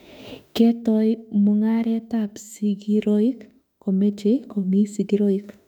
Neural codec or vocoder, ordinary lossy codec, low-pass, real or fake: autoencoder, 48 kHz, 32 numbers a frame, DAC-VAE, trained on Japanese speech; none; 19.8 kHz; fake